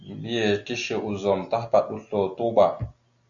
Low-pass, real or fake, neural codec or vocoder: 7.2 kHz; real; none